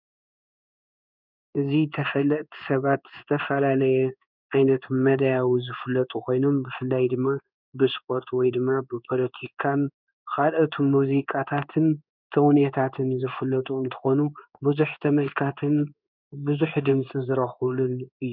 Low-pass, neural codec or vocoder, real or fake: 5.4 kHz; codec, 16 kHz in and 24 kHz out, 1 kbps, XY-Tokenizer; fake